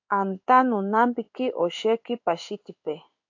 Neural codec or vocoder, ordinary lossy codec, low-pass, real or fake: codec, 16 kHz in and 24 kHz out, 1 kbps, XY-Tokenizer; AAC, 48 kbps; 7.2 kHz; fake